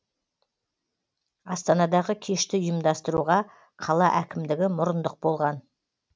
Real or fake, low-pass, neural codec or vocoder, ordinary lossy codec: real; none; none; none